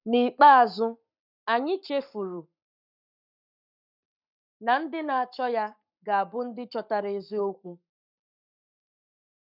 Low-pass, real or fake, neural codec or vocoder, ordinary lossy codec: 5.4 kHz; fake; codec, 44.1 kHz, 7.8 kbps, Pupu-Codec; none